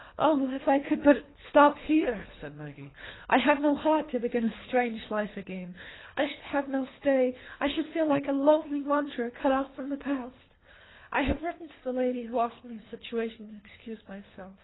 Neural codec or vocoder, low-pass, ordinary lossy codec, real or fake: codec, 24 kHz, 3 kbps, HILCodec; 7.2 kHz; AAC, 16 kbps; fake